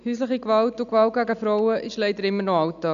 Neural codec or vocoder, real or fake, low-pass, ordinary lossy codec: none; real; 7.2 kHz; none